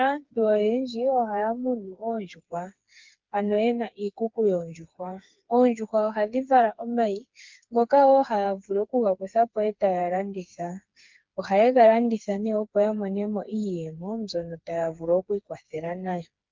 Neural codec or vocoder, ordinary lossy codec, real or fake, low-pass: codec, 16 kHz, 4 kbps, FreqCodec, smaller model; Opus, 32 kbps; fake; 7.2 kHz